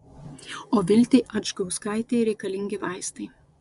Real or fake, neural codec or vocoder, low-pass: fake; vocoder, 24 kHz, 100 mel bands, Vocos; 10.8 kHz